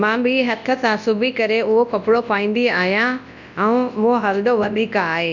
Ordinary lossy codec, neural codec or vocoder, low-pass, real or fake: none; codec, 24 kHz, 0.9 kbps, WavTokenizer, large speech release; 7.2 kHz; fake